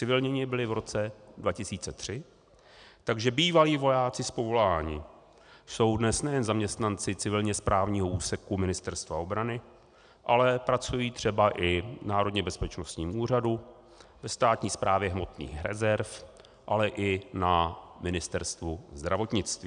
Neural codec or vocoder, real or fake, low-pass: none; real; 9.9 kHz